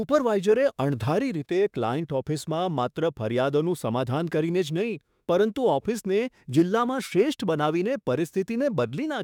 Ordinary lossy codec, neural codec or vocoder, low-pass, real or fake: none; autoencoder, 48 kHz, 32 numbers a frame, DAC-VAE, trained on Japanese speech; 19.8 kHz; fake